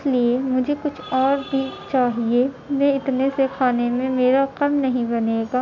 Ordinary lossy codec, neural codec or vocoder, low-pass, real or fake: none; none; 7.2 kHz; real